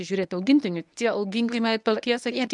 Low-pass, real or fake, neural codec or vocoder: 10.8 kHz; fake; codec, 24 kHz, 0.9 kbps, WavTokenizer, medium speech release version 1